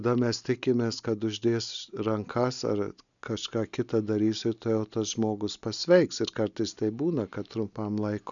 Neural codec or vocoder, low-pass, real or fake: none; 7.2 kHz; real